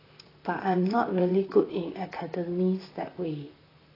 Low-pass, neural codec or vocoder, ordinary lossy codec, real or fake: 5.4 kHz; vocoder, 44.1 kHz, 128 mel bands, Pupu-Vocoder; AAC, 24 kbps; fake